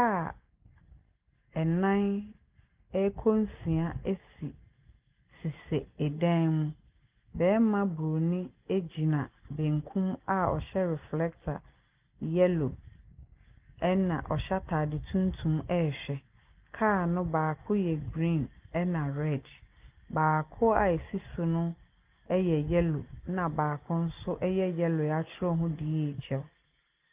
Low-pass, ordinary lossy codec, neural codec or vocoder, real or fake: 3.6 kHz; Opus, 24 kbps; none; real